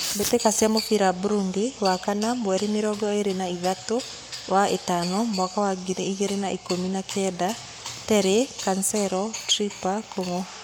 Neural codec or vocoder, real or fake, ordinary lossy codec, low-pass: codec, 44.1 kHz, 7.8 kbps, Pupu-Codec; fake; none; none